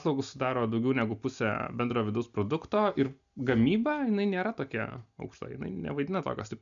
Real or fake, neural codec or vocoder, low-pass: real; none; 7.2 kHz